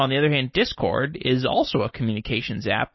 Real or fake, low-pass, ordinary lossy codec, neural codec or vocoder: real; 7.2 kHz; MP3, 24 kbps; none